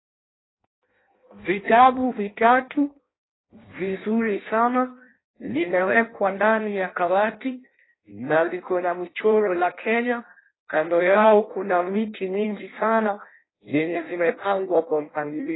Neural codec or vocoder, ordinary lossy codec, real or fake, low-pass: codec, 16 kHz in and 24 kHz out, 0.6 kbps, FireRedTTS-2 codec; AAC, 16 kbps; fake; 7.2 kHz